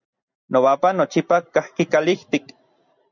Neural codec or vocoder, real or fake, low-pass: none; real; 7.2 kHz